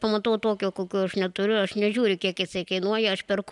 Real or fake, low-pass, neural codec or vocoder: real; 10.8 kHz; none